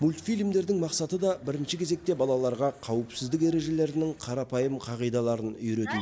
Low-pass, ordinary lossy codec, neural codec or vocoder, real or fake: none; none; none; real